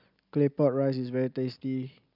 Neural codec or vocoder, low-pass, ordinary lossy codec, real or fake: none; 5.4 kHz; none; real